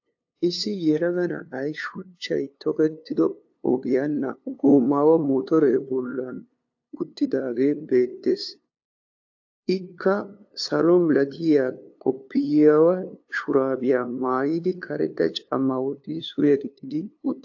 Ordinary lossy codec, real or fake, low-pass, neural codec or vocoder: AAC, 48 kbps; fake; 7.2 kHz; codec, 16 kHz, 2 kbps, FunCodec, trained on LibriTTS, 25 frames a second